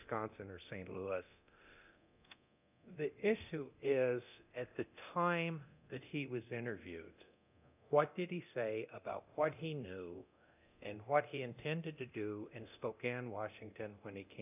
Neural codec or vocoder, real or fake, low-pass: codec, 24 kHz, 0.9 kbps, DualCodec; fake; 3.6 kHz